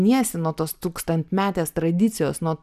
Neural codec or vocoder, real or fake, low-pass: none; real; 14.4 kHz